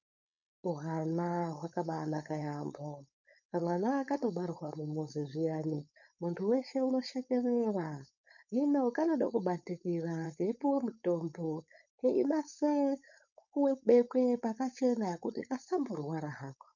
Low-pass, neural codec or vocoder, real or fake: 7.2 kHz; codec, 16 kHz, 4.8 kbps, FACodec; fake